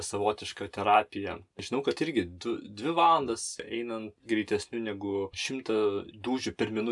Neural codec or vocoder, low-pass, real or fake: none; 10.8 kHz; real